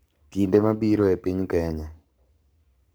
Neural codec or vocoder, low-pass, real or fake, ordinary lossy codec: codec, 44.1 kHz, 7.8 kbps, Pupu-Codec; none; fake; none